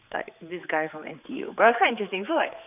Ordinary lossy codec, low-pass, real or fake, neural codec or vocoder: none; 3.6 kHz; fake; codec, 16 kHz, 4 kbps, X-Codec, HuBERT features, trained on general audio